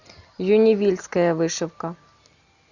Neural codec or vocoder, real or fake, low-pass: none; real; 7.2 kHz